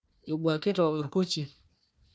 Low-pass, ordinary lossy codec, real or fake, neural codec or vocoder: none; none; fake; codec, 16 kHz, 1 kbps, FunCodec, trained on Chinese and English, 50 frames a second